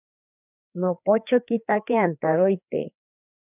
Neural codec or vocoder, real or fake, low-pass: codec, 16 kHz, 2 kbps, FreqCodec, larger model; fake; 3.6 kHz